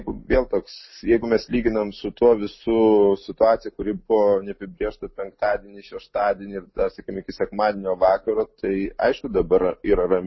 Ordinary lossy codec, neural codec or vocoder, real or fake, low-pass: MP3, 24 kbps; none; real; 7.2 kHz